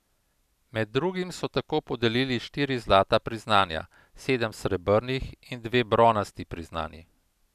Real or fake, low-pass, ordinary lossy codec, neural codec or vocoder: real; 14.4 kHz; none; none